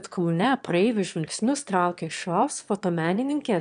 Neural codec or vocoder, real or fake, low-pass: autoencoder, 22.05 kHz, a latent of 192 numbers a frame, VITS, trained on one speaker; fake; 9.9 kHz